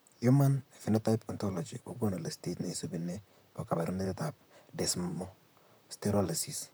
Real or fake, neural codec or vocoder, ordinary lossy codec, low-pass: fake; vocoder, 44.1 kHz, 128 mel bands, Pupu-Vocoder; none; none